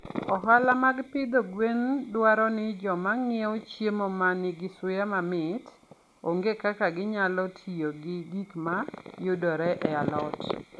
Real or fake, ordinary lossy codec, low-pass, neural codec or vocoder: real; none; none; none